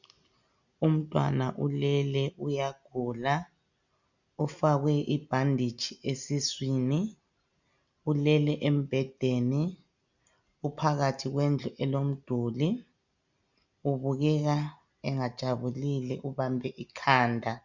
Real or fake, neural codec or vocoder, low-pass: real; none; 7.2 kHz